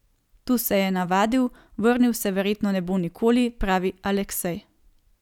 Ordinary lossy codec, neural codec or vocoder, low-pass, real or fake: none; none; 19.8 kHz; real